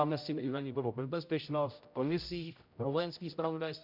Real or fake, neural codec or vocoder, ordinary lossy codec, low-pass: fake; codec, 16 kHz, 0.5 kbps, X-Codec, HuBERT features, trained on general audio; MP3, 48 kbps; 5.4 kHz